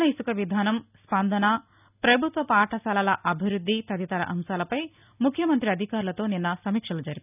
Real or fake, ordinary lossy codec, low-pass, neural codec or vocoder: real; none; 3.6 kHz; none